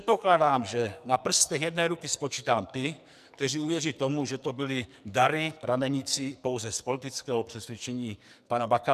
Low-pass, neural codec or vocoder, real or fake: 14.4 kHz; codec, 44.1 kHz, 2.6 kbps, SNAC; fake